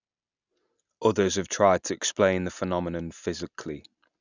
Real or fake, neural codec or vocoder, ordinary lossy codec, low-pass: real; none; none; 7.2 kHz